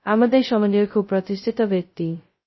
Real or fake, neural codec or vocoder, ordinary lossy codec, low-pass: fake; codec, 16 kHz, 0.2 kbps, FocalCodec; MP3, 24 kbps; 7.2 kHz